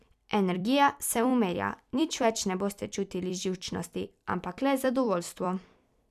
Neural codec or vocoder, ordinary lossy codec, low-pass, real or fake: vocoder, 44.1 kHz, 128 mel bands every 256 samples, BigVGAN v2; none; 14.4 kHz; fake